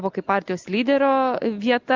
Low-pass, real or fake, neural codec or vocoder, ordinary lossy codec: 7.2 kHz; real; none; Opus, 32 kbps